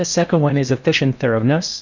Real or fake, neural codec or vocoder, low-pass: fake; codec, 16 kHz in and 24 kHz out, 0.8 kbps, FocalCodec, streaming, 65536 codes; 7.2 kHz